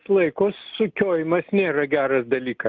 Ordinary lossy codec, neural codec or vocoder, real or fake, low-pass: Opus, 32 kbps; none; real; 7.2 kHz